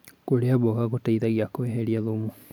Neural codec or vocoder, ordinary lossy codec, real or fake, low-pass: none; none; real; 19.8 kHz